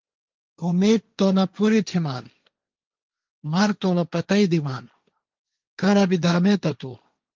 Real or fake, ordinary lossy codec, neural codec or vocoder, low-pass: fake; Opus, 24 kbps; codec, 16 kHz, 1.1 kbps, Voila-Tokenizer; 7.2 kHz